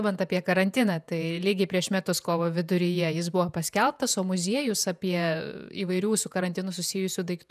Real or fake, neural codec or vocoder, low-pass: fake; vocoder, 48 kHz, 128 mel bands, Vocos; 14.4 kHz